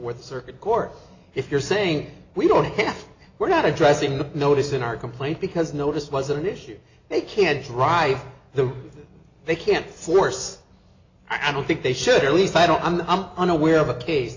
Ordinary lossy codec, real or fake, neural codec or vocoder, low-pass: AAC, 48 kbps; real; none; 7.2 kHz